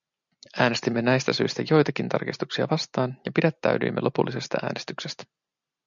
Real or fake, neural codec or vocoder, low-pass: real; none; 7.2 kHz